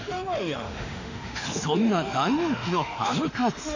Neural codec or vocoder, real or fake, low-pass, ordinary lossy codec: autoencoder, 48 kHz, 32 numbers a frame, DAC-VAE, trained on Japanese speech; fake; 7.2 kHz; none